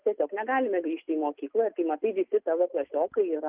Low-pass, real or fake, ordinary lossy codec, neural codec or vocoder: 3.6 kHz; real; Opus, 24 kbps; none